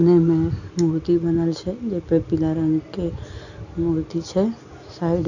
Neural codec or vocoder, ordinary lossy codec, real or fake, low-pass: none; none; real; 7.2 kHz